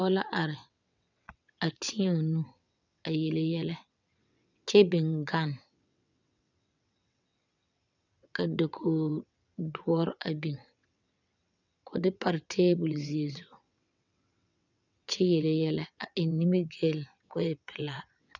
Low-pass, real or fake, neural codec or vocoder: 7.2 kHz; fake; vocoder, 22.05 kHz, 80 mel bands, WaveNeXt